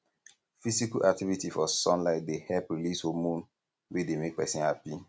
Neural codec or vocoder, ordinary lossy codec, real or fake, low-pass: none; none; real; none